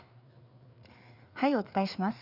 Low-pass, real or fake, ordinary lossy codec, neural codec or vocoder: 5.4 kHz; fake; none; codec, 16 kHz, 4 kbps, FreqCodec, larger model